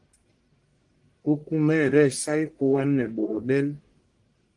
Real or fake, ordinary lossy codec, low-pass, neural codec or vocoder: fake; Opus, 24 kbps; 10.8 kHz; codec, 44.1 kHz, 1.7 kbps, Pupu-Codec